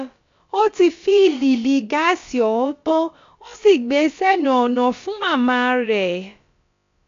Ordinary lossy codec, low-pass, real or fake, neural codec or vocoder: AAC, 64 kbps; 7.2 kHz; fake; codec, 16 kHz, about 1 kbps, DyCAST, with the encoder's durations